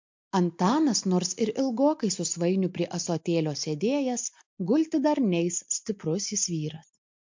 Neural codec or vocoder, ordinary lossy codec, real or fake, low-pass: none; MP3, 48 kbps; real; 7.2 kHz